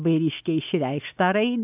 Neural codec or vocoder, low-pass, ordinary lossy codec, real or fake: none; 3.6 kHz; AAC, 32 kbps; real